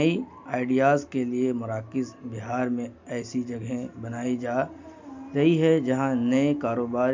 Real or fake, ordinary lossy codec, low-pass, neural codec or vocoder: real; MP3, 64 kbps; 7.2 kHz; none